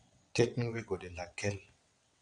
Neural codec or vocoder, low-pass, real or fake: vocoder, 22.05 kHz, 80 mel bands, WaveNeXt; 9.9 kHz; fake